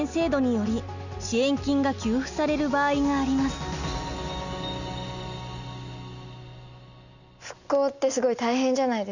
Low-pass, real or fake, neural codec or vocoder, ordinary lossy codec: 7.2 kHz; real; none; none